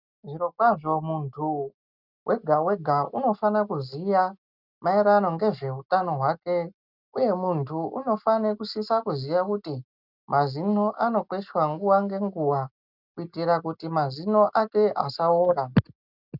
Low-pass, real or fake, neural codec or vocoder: 5.4 kHz; real; none